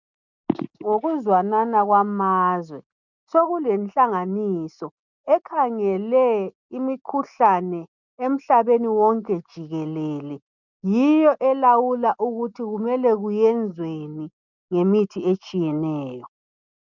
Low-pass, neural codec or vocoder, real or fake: 7.2 kHz; none; real